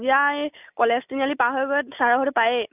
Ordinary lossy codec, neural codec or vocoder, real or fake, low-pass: none; none; real; 3.6 kHz